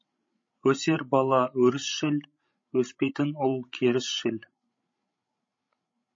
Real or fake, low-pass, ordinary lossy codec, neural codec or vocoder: fake; 7.2 kHz; MP3, 32 kbps; codec, 16 kHz, 16 kbps, FreqCodec, larger model